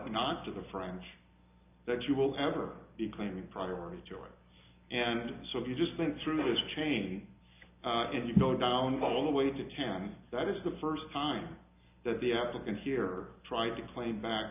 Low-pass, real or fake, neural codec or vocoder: 3.6 kHz; real; none